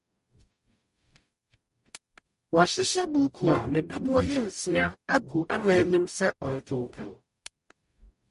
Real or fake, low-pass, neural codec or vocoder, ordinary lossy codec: fake; 14.4 kHz; codec, 44.1 kHz, 0.9 kbps, DAC; MP3, 48 kbps